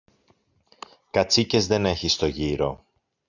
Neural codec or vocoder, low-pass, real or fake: none; 7.2 kHz; real